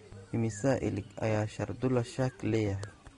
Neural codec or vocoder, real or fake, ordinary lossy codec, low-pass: none; real; AAC, 32 kbps; 10.8 kHz